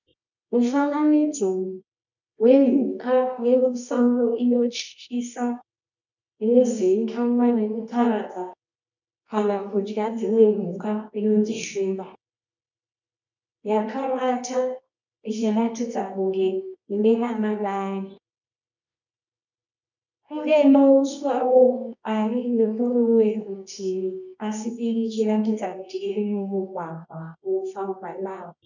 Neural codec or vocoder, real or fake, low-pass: codec, 24 kHz, 0.9 kbps, WavTokenizer, medium music audio release; fake; 7.2 kHz